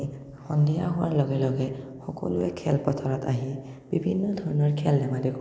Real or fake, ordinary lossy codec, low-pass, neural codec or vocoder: real; none; none; none